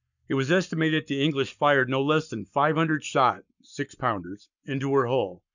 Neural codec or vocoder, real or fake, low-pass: codec, 44.1 kHz, 7.8 kbps, Pupu-Codec; fake; 7.2 kHz